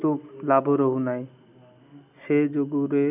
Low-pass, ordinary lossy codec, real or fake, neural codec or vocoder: 3.6 kHz; none; real; none